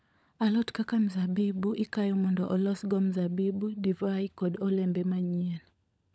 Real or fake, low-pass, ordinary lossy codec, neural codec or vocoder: fake; none; none; codec, 16 kHz, 16 kbps, FunCodec, trained on LibriTTS, 50 frames a second